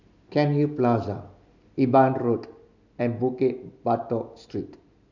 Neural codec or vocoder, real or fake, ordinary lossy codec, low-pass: none; real; none; 7.2 kHz